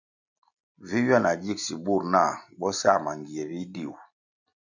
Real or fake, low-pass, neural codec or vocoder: real; 7.2 kHz; none